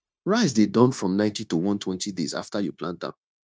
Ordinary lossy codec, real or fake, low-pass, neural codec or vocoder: none; fake; none; codec, 16 kHz, 0.9 kbps, LongCat-Audio-Codec